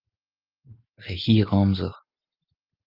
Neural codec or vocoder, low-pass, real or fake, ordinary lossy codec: none; 5.4 kHz; real; Opus, 24 kbps